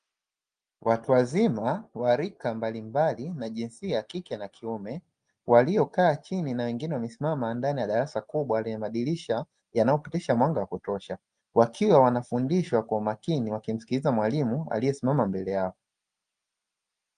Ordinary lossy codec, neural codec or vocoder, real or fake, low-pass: Opus, 32 kbps; none; real; 9.9 kHz